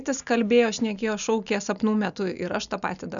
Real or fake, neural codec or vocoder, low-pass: real; none; 7.2 kHz